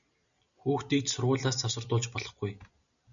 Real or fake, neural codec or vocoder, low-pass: real; none; 7.2 kHz